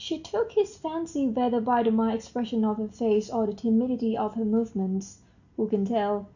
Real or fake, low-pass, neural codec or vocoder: real; 7.2 kHz; none